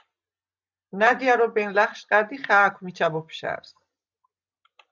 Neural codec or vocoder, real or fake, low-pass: none; real; 7.2 kHz